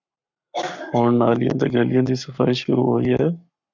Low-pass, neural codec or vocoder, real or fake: 7.2 kHz; codec, 44.1 kHz, 7.8 kbps, Pupu-Codec; fake